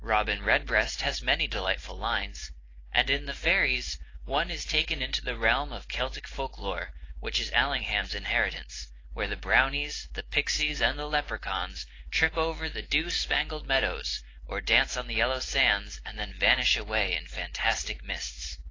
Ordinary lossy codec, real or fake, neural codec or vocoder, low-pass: AAC, 32 kbps; real; none; 7.2 kHz